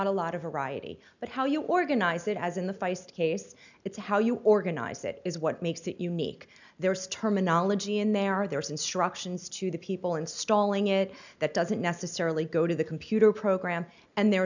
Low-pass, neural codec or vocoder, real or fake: 7.2 kHz; none; real